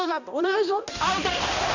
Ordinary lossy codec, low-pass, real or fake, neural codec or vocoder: none; 7.2 kHz; fake; codec, 16 kHz, 1 kbps, X-Codec, HuBERT features, trained on balanced general audio